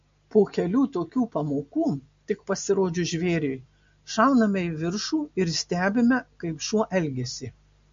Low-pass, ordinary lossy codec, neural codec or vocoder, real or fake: 7.2 kHz; MP3, 48 kbps; none; real